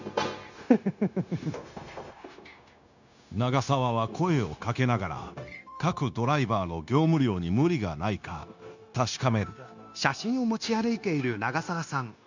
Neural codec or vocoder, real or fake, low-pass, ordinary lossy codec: codec, 16 kHz, 0.9 kbps, LongCat-Audio-Codec; fake; 7.2 kHz; none